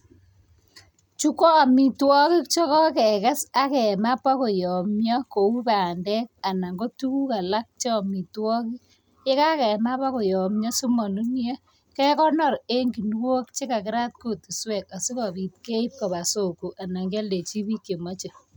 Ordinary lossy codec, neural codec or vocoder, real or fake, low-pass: none; none; real; none